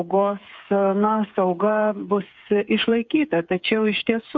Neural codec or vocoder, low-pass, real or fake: codec, 16 kHz, 8 kbps, FreqCodec, smaller model; 7.2 kHz; fake